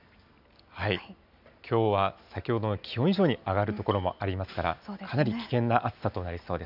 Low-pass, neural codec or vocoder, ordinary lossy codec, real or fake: 5.4 kHz; none; none; real